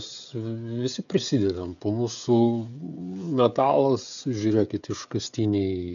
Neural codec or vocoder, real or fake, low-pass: codec, 16 kHz, 16 kbps, FreqCodec, smaller model; fake; 7.2 kHz